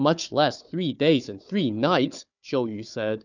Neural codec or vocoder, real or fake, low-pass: codec, 16 kHz, 4 kbps, FunCodec, trained on Chinese and English, 50 frames a second; fake; 7.2 kHz